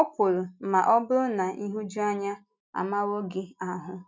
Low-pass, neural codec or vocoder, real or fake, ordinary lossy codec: none; none; real; none